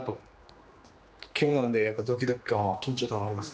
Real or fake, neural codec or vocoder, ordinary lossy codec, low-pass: fake; codec, 16 kHz, 2 kbps, X-Codec, HuBERT features, trained on general audio; none; none